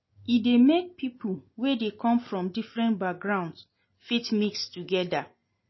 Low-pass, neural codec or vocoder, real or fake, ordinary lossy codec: 7.2 kHz; none; real; MP3, 24 kbps